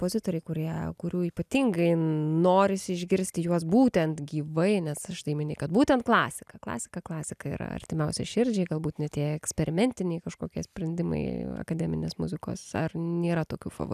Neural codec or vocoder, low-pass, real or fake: none; 14.4 kHz; real